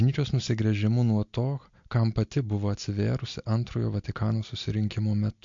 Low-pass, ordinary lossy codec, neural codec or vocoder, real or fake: 7.2 kHz; MP3, 48 kbps; none; real